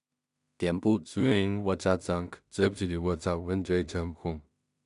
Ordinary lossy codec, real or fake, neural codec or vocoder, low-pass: none; fake; codec, 16 kHz in and 24 kHz out, 0.4 kbps, LongCat-Audio-Codec, two codebook decoder; 10.8 kHz